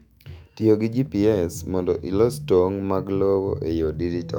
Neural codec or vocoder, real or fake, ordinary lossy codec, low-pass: autoencoder, 48 kHz, 128 numbers a frame, DAC-VAE, trained on Japanese speech; fake; none; 19.8 kHz